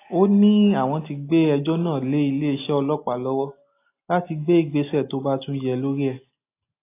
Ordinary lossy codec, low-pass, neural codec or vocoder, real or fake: AAC, 24 kbps; 3.6 kHz; none; real